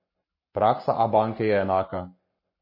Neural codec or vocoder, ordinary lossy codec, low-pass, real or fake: codec, 44.1 kHz, 7.8 kbps, Pupu-Codec; MP3, 24 kbps; 5.4 kHz; fake